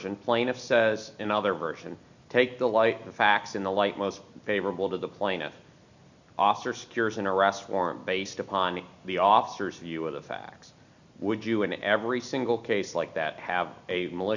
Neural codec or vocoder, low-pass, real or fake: none; 7.2 kHz; real